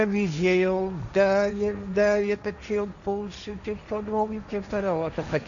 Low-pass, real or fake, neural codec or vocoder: 7.2 kHz; fake; codec, 16 kHz, 1.1 kbps, Voila-Tokenizer